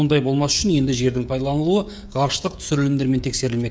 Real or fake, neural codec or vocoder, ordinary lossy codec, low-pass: fake; codec, 16 kHz, 16 kbps, FreqCodec, smaller model; none; none